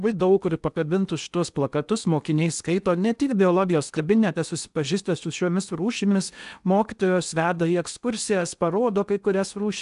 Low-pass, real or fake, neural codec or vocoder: 10.8 kHz; fake; codec, 16 kHz in and 24 kHz out, 0.8 kbps, FocalCodec, streaming, 65536 codes